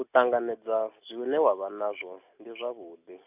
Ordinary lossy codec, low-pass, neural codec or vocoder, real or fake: none; 3.6 kHz; none; real